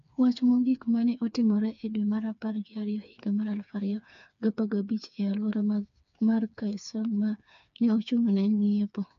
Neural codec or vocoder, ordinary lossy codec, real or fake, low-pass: codec, 16 kHz, 4 kbps, FreqCodec, smaller model; none; fake; 7.2 kHz